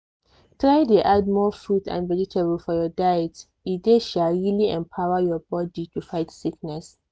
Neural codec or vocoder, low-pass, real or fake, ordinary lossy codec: none; none; real; none